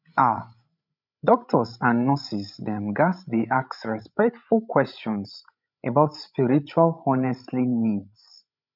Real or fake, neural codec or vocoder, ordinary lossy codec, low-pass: fake; codec, 16 kHz, 16 kbps, FreqCodec, larger model; none; 5.4 kHz